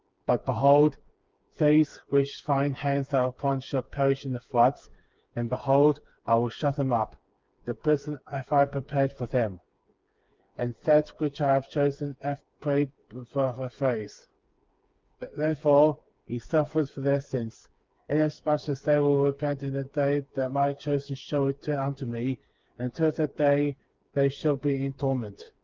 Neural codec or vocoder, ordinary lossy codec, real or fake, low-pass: codec, 16 kHz, 4 kbps, FreqCodec, smaller model; Opus, 24 kbps; fake; 7.2 kHz